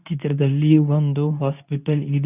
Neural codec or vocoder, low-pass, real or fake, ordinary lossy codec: codec, 24 kHz, 0.9 kbps, WavTokenizer, medium speech release version 2; 3.6 kHz; fake; none